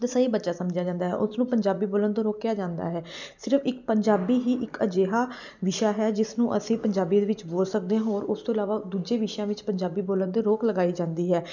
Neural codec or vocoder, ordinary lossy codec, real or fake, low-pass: none; none; real; 7.2 kHz